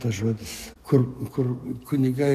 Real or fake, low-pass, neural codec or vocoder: fake; 14.4 kHz; codec, 44.1 kHz, 7.8 kbps, DAC